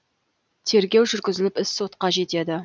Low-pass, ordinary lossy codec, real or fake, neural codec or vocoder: none; none; real; none